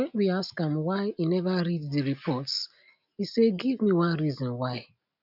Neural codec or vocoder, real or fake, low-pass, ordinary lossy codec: none; real; 5.4 kHz; none